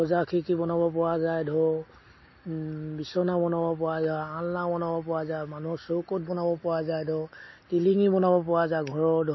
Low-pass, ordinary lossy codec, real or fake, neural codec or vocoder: 7.2 kHz; MP3, 24 kbps; real; none